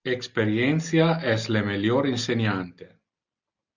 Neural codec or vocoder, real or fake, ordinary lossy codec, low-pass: none; real; Opus, 64 kbps; 7.2 kHz